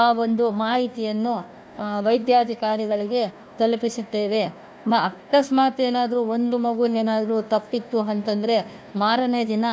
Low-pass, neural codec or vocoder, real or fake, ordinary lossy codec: none; codec, 16 kHz, 1 kbps, FunCodec, trained on Chinese and English, 50 frames a second; fake; none